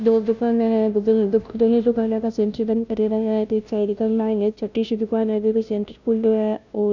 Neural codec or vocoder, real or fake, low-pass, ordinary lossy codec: codec, 16 kHz, 0.5 kbps, FunCodec, trained on Chinese and English, 25 frames a second; fake; 7.2 kHz; none